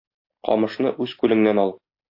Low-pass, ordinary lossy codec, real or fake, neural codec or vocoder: 5.4 kHz; AAC, 32 kbps; real; none